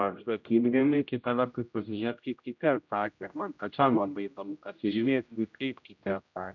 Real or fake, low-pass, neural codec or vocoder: fake; 7.2 kHz; codec, 16 kHz, 0.5 kbps, X-Codec, HuBERT features, trained on general audio